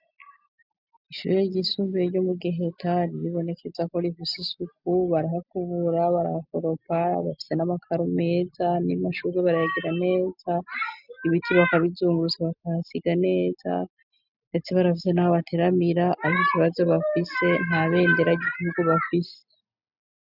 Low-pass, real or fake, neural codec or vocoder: 5.4 kHz; real; none